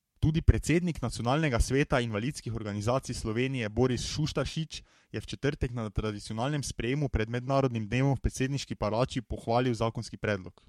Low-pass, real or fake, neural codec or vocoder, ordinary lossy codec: 19.8 kHz; fake; codec, 44.1 kHz, 7.8 kbps, Pupu-Codec; MP3, 64 kbps